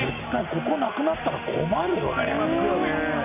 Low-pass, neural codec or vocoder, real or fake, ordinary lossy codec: 3.6 kHz; none; real; none